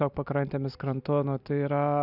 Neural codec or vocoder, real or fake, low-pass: none; real; 5.4 kHz